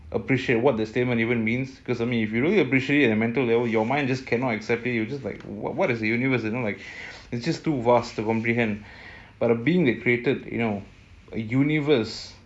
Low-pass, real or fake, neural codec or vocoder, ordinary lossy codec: none; real; none; none